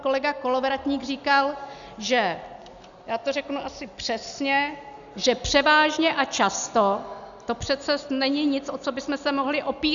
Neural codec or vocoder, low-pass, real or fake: none; 7.2 kHz; real